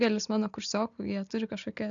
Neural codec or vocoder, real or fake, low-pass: none; real; 7.2 kHz